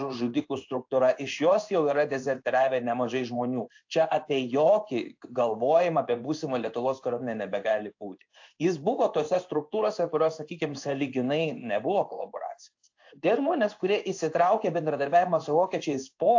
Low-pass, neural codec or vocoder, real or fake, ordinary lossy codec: 7.2 kHz; codec, 16 kHz in and 24 kHz out, 1 kbps, XY-Tokenizer; fake; AAC, 48 kbps